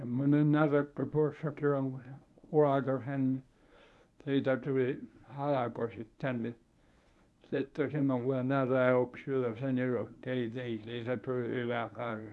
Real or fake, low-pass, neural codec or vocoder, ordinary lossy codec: fake; none; codec, 24 kHz, 0.9 kbps, WavTokenizer, medium speech release version 1; none